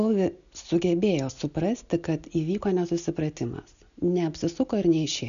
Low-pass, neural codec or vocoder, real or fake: 7.2 kHz; none; real